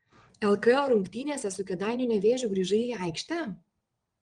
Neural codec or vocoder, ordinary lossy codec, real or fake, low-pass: vocoder, 22.05 kHz, 80 mel bands, WaveNeXt; Opus, 24 kbps; fake; 9.9 kHz